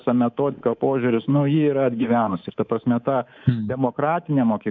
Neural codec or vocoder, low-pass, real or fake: codec, 24 kHz, 3.1 kbps, DualCodec; 7.2 kHz; fake